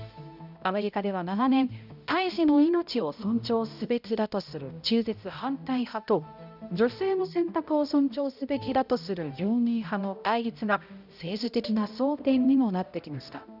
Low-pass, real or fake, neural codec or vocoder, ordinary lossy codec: 5.4 kHz; fake; codec, 16 kHz, 0.5 kbps, X-Codec, HuBERT features, trained on balanced general audio; none